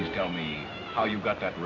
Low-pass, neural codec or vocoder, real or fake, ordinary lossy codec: 7.2 kHz; none; real; AAC, 32 kbps